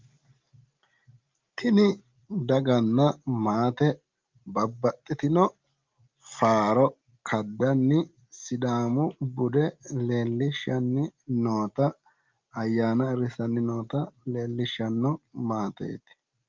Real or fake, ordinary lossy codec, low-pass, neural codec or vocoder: real; Opus, 32 kbps; 7.2 kHz; none